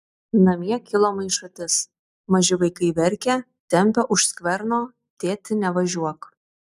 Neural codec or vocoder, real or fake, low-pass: none; real; 14.4 kHz